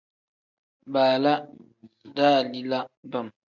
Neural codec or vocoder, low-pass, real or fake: none; 7.2 kHz; real